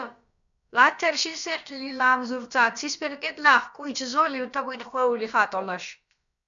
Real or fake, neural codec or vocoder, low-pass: fake; codec, 16 kHz, about 1 kbps, DyCAST, with the encoder's durations; 7.2 kHz